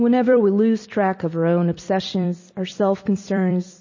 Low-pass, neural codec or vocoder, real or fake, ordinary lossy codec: 7.2 kHz; vocoder, 44.1 kHz, 128 mel bands every 256 samples, BigVGAN v2; fake; MP3, 32 kbps